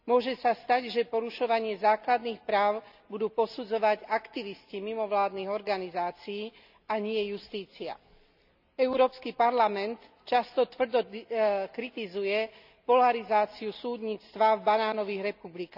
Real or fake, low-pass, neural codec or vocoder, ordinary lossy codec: real; 5.4 kHz; none; none